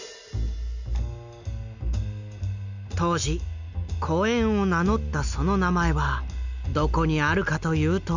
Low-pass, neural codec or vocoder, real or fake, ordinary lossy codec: 7.2 kHz; none; real; none